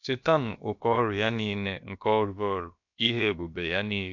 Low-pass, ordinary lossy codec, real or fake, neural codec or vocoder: 7.2 kHz; none; fake; codec, 16 kHz, about 1 kbps, DyCAST, with the encoder's durations